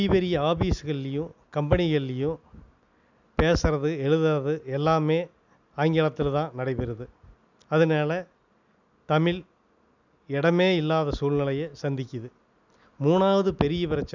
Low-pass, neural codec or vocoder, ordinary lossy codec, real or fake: 7.2 kHz; none; none; real